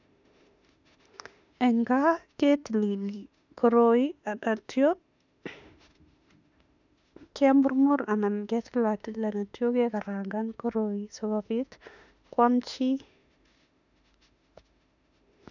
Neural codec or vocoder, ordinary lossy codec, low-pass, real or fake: autoencoder, 48 kHz, 32 numbers a frame, DAC-VAE, trained on Japanese speech; none; 7.2 kHz; fake